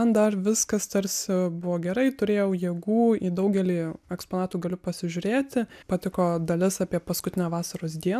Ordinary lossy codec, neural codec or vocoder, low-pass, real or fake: AAC, 96 kbps; none; 14.4 kHz; real